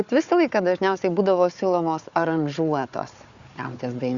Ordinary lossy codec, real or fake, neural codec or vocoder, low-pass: Opus, 64 kbps; fake; codec, 16 kHz, 4 kbps, FunCodec, trained on Chinese and English, 50 frames a second; 7.2 kHz